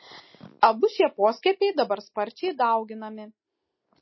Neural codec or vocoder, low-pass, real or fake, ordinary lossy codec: none; 7.2 kHz; real; MP3, 24 kbps